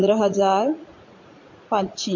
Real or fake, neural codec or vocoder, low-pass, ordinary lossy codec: fake; vocoder, 44.1 kHz, 80 mel bands, Vocos; 7.2 kHz; MP3, 48 kbps